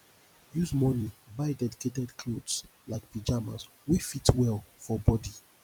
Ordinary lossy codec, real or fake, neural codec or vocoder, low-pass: none; fake; vocoder, 44.1 kHz, 128 mel bands every 256 samples, BigVGAN v2; 19.8 kHz